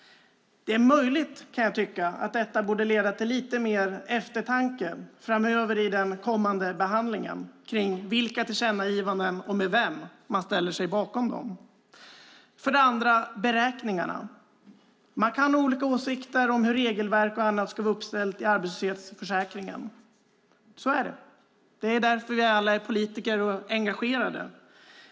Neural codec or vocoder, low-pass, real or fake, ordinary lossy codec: none; none; real; none